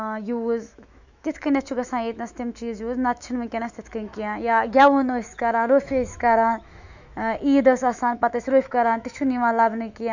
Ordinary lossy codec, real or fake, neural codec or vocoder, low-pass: none; fake; autoencoder, 48 kHz, 128 numbers a frame, DAC-VAE, trained on Japanese speech; 7.2 kHz